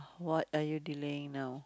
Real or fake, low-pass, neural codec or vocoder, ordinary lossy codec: real; none; none; none